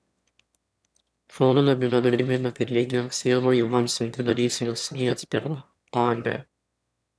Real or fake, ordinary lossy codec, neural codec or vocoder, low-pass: fake; none; autoencoder, 22.05 kHz, a latent of 192 numbers a frame, VITS, trained on one speaker; none